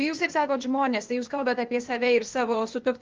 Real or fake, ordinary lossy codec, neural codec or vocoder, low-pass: fake; Opus, 32 kbps; codec, 16 kHz, 0.8 kbps, ZipCodec; 7.2 kHz